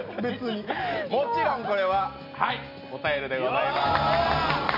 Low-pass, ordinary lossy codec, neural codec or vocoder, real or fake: 5.4 kHz; none; none; real